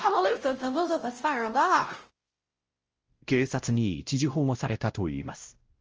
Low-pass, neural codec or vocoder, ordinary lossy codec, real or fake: 7.2 kHz; codec, 16 kHz, 0.5 kbps, X-Codec, WavLM features, trained on Multilingual LibriSpeech; Opus, 24 kbps; fake